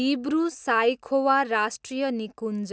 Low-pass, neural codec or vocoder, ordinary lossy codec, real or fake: none; none; none; real